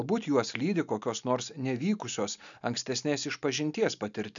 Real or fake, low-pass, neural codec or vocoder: real; 7.2 kHz; none